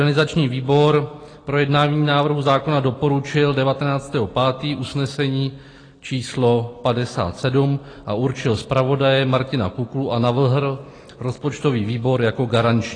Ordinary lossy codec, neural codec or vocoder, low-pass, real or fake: AAC, 32 kbps; none; 9.9 kHz; real